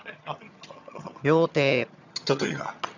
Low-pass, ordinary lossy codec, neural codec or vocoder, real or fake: 7.2 kHz; none; vocoder, 22.05 kHz, 80 mel bands, HiFi-GAN; fake